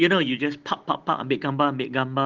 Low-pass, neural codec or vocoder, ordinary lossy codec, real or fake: 7.2 kHz; none; Opus, 16 kbps; real